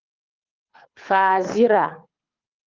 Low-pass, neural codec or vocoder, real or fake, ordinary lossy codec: 7.2 kHz; codec, 24 kHz, 6 kbps, HILCodec; fake; Opus, 32 kbps